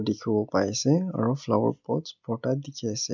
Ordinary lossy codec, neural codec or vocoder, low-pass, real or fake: none; none; 7.2 kHz; real